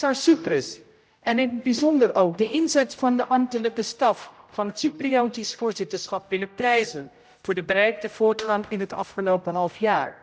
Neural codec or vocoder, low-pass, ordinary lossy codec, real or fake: codec, 16 kHz, 0.5 kbps, X-Codec, HuBERT features, trained on general audio; none; none; fake